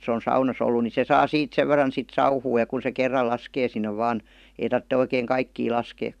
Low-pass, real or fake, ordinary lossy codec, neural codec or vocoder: 14.4 kHz; fake; none; vocoder, 44.1 kHz, 128 mel bands every 512 samples, BigVGAN v2